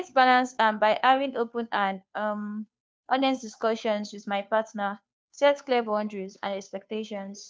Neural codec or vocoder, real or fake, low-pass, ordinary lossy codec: codec, 16 kHz, 2 kbps, FunCodec, trained on Chinese and English, 25 frames a second; fake; none; none